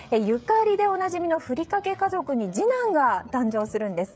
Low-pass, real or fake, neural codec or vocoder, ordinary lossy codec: none; fake; codec, 16 kHz, 16 kbps, FreqCodec, smaller model; none